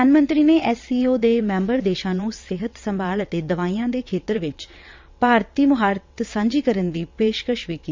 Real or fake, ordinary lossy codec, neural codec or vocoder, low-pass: fake; MP3, 64 kbps; vocoder, 22.05 kHz, 80 mel bands, WaveNeXt; 7.2 kHz